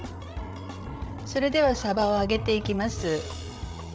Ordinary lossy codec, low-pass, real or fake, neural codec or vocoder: none; none; fake; codec, 16 kHz, 16 kbps, FreqCodec, larger model